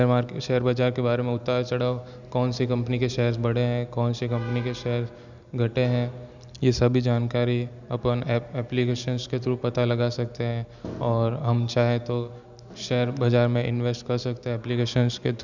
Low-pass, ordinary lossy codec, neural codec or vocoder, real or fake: 7.2 kHz; none; none; real